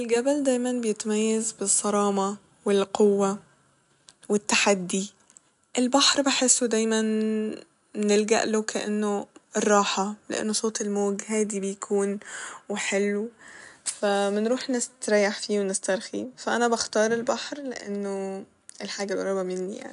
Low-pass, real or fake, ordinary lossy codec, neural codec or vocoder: 10.8 kHz; real; none; none